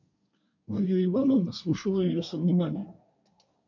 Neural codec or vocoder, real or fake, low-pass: codec, 24 kHz, 1 kbps, SNAC; fake; 7.2 kHz